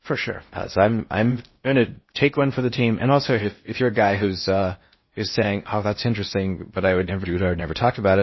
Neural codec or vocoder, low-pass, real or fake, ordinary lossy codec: codec, 16 kHz in and 24 kHz out, 0.6 kbps, FocalCodec, streaming, 4096 codes; 7.2 kHz; fake; MP3, 24 kbps